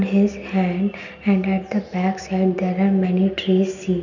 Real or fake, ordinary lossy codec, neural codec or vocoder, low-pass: real; none; none; 7.2 kHz